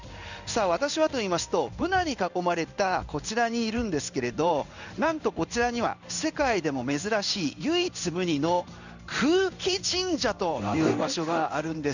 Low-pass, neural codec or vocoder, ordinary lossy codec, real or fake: 7.2 kHz; codec, 16 kHz in and 24 kHz out, 1 kbps, XY-Tokenizer; none; fake